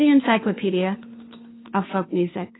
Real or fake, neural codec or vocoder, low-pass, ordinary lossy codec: fake; codec, 16 kHz, 2 kbps, FunCodec, trained on Chinese and English, 25 frames a second; 7.2 kHz; AAC, 16 kbps